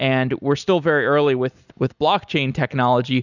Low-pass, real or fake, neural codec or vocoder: 7.2 kHz; real; none